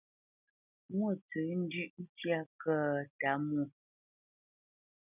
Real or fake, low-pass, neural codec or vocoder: real; 3.6 kHz; none